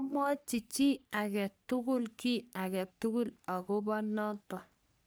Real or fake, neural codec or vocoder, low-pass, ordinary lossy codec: fake; codec, 44.1 kHz, 3.4 kbps, Pupu-Codec; none; none